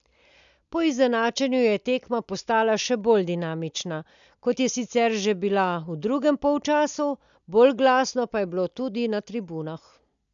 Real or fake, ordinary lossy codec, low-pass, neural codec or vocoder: real; none; 7.2 kHz; none